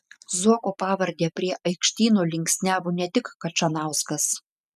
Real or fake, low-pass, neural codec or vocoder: real; 14.4 kHz; none